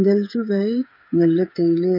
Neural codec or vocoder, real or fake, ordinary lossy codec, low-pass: codec, 16 kHz, 8 kbps, FreqCodec, smaller model; fake; none; 5.4 kHz